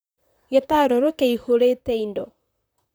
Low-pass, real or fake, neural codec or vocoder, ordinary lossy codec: none; fake; vocoder, 44.1 kHz, 128 mel bands, Pupu-Vocoder; none